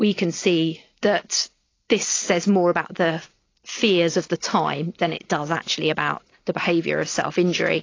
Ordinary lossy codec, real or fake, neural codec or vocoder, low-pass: AAC, 32 kbps; real; none; 7.2 kHz